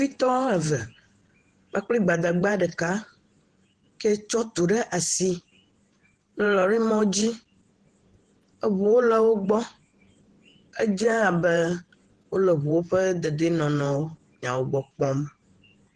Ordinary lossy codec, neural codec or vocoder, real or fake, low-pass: Opus, 16 kbps; vocoder, 48 kHz, 128 mel bands, Vocos; fake; 10.8 kHz